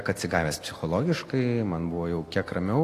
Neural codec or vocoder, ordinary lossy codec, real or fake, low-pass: none; AAC, 48 kbps; real; 14.4 kHz